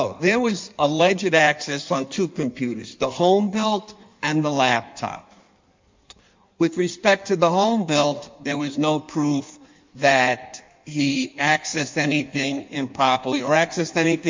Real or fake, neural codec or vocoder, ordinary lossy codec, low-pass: fake; codec, 16 kHz in and 24 kHz out, 1.1 kbps, FireRedTTS-2 codec; MP3, 64 kbps; 7.2 kHz